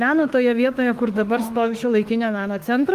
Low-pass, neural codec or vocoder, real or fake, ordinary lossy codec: 14.4 kHz; autoencoder, 48 kHz, 32 numbers a frame, DAC-VAE, trained on Japanese speech; fake; Opus, 32 kbps